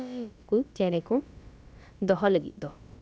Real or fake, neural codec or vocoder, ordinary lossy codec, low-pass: fake; codec, 16 kHz, about 1 kbps, DyCAST, with the encoder's durations; none; none